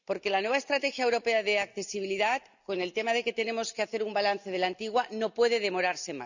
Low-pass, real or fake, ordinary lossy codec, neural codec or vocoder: 7.2 kHz; real; none; none